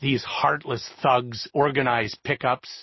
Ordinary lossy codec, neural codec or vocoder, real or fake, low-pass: MP3, 24 kbps; none; real; 7.2 kHz